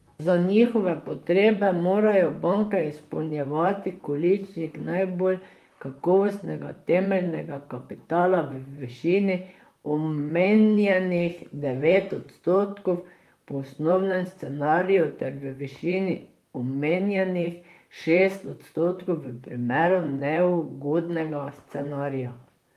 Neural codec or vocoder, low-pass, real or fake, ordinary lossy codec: vocoder, 44.1 kHz, 128 mel bands, Pupu-Vocoder; 14.4 kHz; fake; Opus, 32 kbps